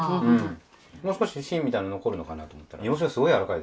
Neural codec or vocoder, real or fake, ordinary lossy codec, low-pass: none; real; none; none